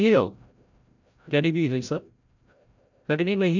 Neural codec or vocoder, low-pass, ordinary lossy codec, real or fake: codec, 16 kHz, 0.5 kbps, FreqCodec, larger model; 7.2 kHz; none; fake